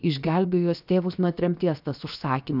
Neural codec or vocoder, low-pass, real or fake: codec, 16 kHz, 0.7 kbps, FocalCodec; 5.4 kHz; fake